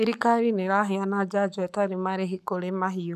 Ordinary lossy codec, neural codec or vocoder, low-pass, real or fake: none; codec, 44.1 kHz, 7.8 kbps, Pupu-Codec; 14.4 kHz; fake